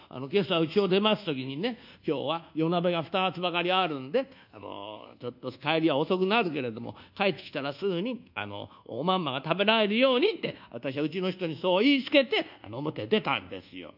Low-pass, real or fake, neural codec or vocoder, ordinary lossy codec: 5.4 kHz; fake; codec, 24 kHz, 1.2 kbps, DualCodec; none